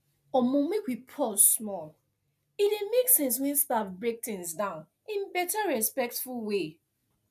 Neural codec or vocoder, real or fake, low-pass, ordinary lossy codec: none; real; 14.4 kHz; none